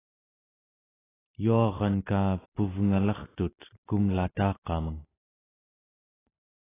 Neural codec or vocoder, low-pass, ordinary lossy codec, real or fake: none; 3.6 kHz; AAC, 16 kbps; real